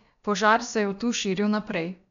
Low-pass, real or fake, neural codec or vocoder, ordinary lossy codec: 7.2 kHz; fake; codec, 16 kHz, about 1 kbps, DyCAST, with the encoder's durations; MP3, 96 kbps